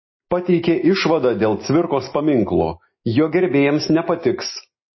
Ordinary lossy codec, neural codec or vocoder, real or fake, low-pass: MP3, 24 kbps; none; real; 7.2 kHz